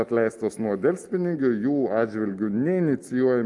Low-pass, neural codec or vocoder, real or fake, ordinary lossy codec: 10.8 kHz; none; real; Opus, 32 kbps